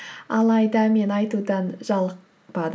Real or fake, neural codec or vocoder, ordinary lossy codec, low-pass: real; none; none; none